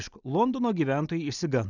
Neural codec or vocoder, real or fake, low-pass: none; real; 7.2 kHz